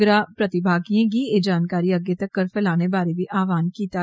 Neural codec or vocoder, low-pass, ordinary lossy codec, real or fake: none; none; none; real